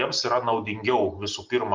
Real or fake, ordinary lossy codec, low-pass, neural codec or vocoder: real; Opus, 32 kbps; 7.2 kHz; none